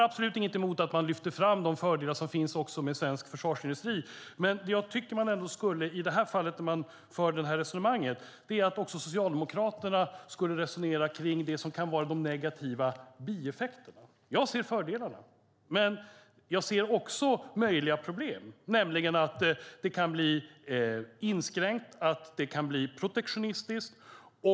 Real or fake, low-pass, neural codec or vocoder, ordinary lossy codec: real; none; none; none